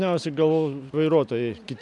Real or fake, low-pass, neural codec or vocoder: real; 10.8 kHz; none